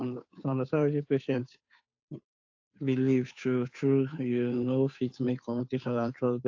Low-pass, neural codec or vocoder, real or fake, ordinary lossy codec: 7.2 kHz; codec, 16 kHz, 2 kbps, FunCodec, trained on Chinese and English, 25 frames a second; fake; AAC, 48 kbps